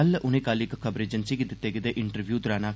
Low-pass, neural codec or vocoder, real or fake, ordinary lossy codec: none; none; real; none